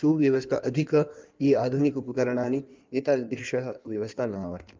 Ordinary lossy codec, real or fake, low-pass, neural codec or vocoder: Opus, 32 kbps; fake; 7.2 kHz; codec, 16 kHz in and 24 kHz out, 1.1 kbps, FireRedTTS-2 codec